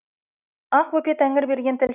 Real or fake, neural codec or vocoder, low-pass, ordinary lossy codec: real; none; 3.6 kHz; none